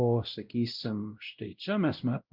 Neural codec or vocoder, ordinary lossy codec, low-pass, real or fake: codec, 16 kHz, 0.5 kbps, X-Codec, WavLM features, trained on Multilingual LibriSpeech; Opus, 24 kbps; 5.4 kHz; fake